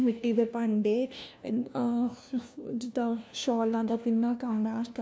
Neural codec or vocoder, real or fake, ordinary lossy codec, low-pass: codec, 16 kHz, 1 kbps, FunCodec, trained on LibriTTS, 50 frames a second; fake; none; none